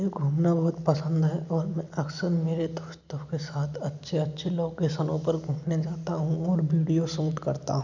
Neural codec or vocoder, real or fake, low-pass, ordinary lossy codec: none; real; 7.2 kHz; none